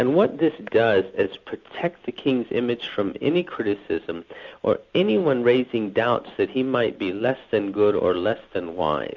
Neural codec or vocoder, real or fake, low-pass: none; real; 7.2 kHz